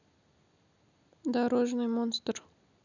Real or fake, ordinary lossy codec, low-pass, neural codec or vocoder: real; none; 7.2 kHz; none